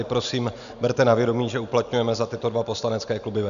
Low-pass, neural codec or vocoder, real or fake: 7.2 kHz; none; real